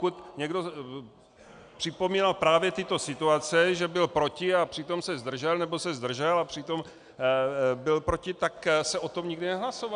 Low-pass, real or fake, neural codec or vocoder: 9.9 kHz; real; none